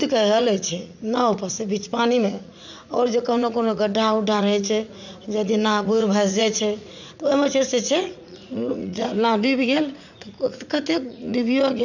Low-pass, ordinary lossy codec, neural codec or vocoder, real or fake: 7.2 kHz; none; vocoder, 44.1 kHz, 80 mel bands, Vocos; fake